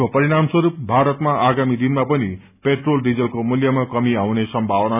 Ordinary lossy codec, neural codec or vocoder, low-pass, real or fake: none; none; 3.6 kHz; real